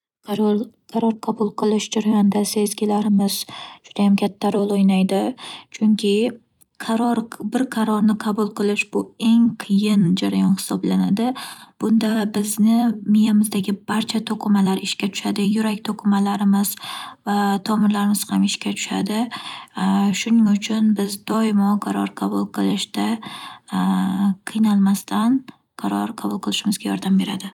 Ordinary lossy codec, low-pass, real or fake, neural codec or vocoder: none; 19.8 kHz; fake; vocoder, 44.1 kHz, 128 mel bands every 256 samples, BigVGAN v2